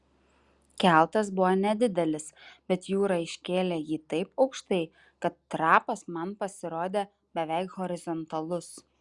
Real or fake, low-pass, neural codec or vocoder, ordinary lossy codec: real; 10.8 kHz; none; Opus, 64 kbps